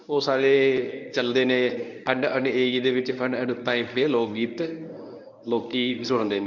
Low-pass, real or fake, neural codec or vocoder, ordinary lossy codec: 7.2 kHz; fake; codec, 24 kHz, 0.9 kbps, WavTokenizer, medium speech release version 1; none